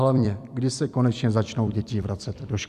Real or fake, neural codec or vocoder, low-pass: fake; vocoder, 44.1 kHz, 128 mel bands every 256 samples, BigVGAN v2; 14.4 kHz